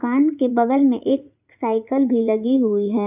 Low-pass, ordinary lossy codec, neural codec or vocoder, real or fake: 3.6 kHz; none; vocoder, 44.1 kHz, 80 mel bands, Vocos; fake